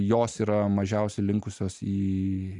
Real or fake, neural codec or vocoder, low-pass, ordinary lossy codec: real; none; 10.8 kHz; AAC, 64 kbps